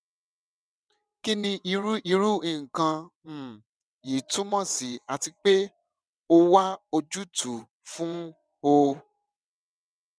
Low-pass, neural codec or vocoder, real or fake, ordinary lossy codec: none; vocoder, 22.05 kHz, 80 mel bands, WaveNeXt; fake; none